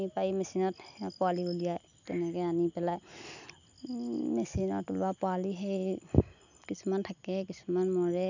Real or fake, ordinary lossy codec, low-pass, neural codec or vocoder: real; none; 7.2 kHz; none